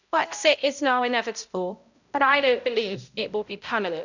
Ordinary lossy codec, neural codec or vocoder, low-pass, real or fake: none; codec, 16 kHz, 0.5 kbps, X-Codec, HuBERT features, trained on balanced general audio; 7.2 kHz; fake